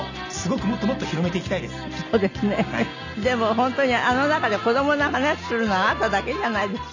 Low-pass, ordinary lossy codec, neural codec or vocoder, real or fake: 7.2 kHz; none; none; real